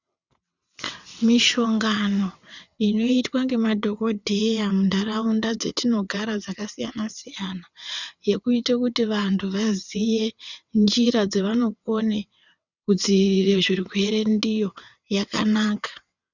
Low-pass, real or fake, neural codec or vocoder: 7.2 kHz; fake; vocoder, 22.05 kHz, 80 mel bands, WaveNeXt